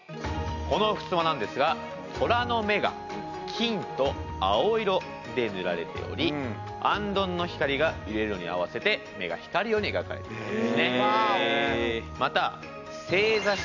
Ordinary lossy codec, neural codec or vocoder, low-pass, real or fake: none; none; 7.2 kHz; real